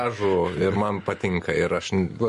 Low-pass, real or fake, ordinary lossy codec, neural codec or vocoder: 14.4 kHz; real; MP3, 48 kbps; none